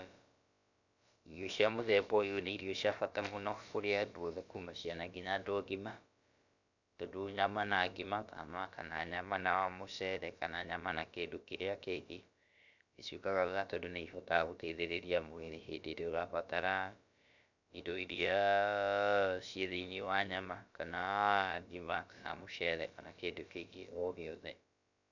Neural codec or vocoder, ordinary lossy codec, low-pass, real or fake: codec, 16 kHz, about 1 kbps, DyCAST, with the encoder's durations; none; 7.2 kHz; fake